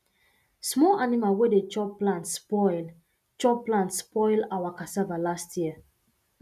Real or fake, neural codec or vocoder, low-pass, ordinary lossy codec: real; none; 14.4 kHz; none